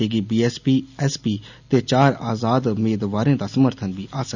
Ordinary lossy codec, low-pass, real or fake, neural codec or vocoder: none; 7.2 kHz; real; none